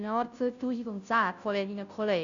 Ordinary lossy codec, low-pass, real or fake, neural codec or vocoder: none; 7.2 kHz; fake; codec, 16 kHz, 0.5 kbps, FunCodec, trained on Chinese and English, 25 frames a second